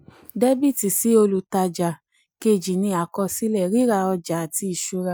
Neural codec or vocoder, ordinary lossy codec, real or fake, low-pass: none; none; real; none